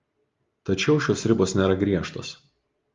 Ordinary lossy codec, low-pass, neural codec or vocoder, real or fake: Opus, 32 kbps; 7.2 kHz; none; real